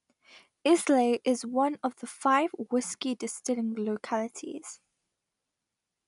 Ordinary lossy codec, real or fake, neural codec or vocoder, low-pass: none; real; none; 10.8 kHz